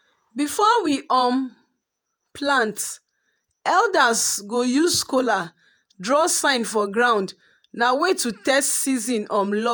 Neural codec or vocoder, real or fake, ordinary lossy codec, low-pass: vocoder, 48 kHz, 128 mel bands, Vocos; fake; none; none